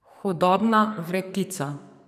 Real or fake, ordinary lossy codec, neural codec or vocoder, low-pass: fake; none; codec, 44.1 kHz, 2.6 kbps, SNAC; 14.4 kHz